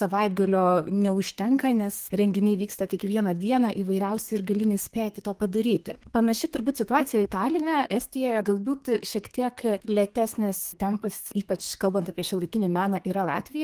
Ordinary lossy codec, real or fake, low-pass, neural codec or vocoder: Opus, 24 kbps; fake; 14.4 kHz; codec, 32 kHz, 1.9 kbps, SNAC